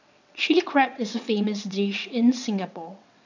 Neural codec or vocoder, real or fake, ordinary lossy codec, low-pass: vocoder, 22.05 kHz, 80 mel bands, WaveNeXt; fake; none; 7.2 kHz